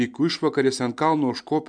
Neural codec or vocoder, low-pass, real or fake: none; 9.9 kHz; real